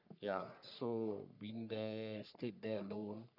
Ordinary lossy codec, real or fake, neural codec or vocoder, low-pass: none; fake; codec, 44.1 kHz, 3.4 kbps, Pupu-Codec; 5.4 kHz